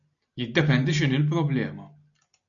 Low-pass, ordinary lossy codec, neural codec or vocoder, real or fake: 7.2 kHz; MP3, 96 kbps; none; real